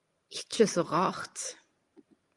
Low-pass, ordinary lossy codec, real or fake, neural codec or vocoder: 10.8 kHz; Opus, 32 kbps; real; none